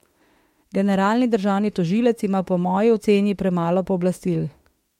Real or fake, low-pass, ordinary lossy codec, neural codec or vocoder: fake; 19.8 kHz; MP3, 64 kbps; autoencoder, 48 kHz, 32 numbers a frame, DAC-VAE, trained on Japanese speech